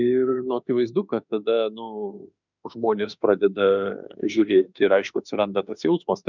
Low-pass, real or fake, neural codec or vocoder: 7.2 kHz; fake; autoencoder, 48 kHz, 32 numbers a frame, DAC-VAE, trained on Japanese speech